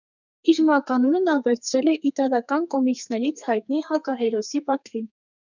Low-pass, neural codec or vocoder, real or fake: 7.2 kHz; codec, 32 kHz, 1.9 kbps, SNAC; fake